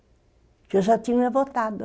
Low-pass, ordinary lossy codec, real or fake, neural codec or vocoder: none; none; real; none